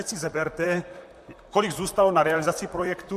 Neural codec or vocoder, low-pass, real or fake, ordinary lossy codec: vocoder, 44.1 kHz, 128 mel bands, Pupu-Vocoder; 14.4 kHz; fake; MP3, 64 kbps